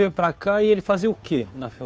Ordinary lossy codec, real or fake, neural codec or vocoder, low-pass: none; fake; codec, 16 kHz, 2 kbps, FunCodec, trained on Chinese and English, 25 frames a second; none